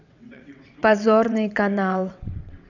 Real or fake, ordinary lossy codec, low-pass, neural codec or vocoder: fake; none; 7.2 kHz; vocoder, 22.05 kHz, 80 mel bands, WaveNeXt